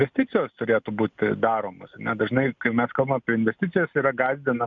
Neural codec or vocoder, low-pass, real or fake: none; 7.2 kHz; real